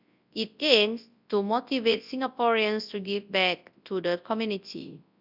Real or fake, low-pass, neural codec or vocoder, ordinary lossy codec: fake; 5.4 kHz; codec, 24 kHz, 0.9 kbps, WavTokenizer, large speech release; none